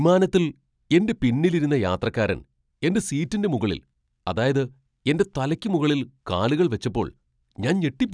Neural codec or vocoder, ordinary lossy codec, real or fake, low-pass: none; none; real; 9.9 kHz